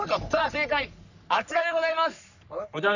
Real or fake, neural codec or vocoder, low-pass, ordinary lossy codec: fake; codec, 44.1 kHz, 3.4 kbps, Pupu-Codec; 7.2 kHz; none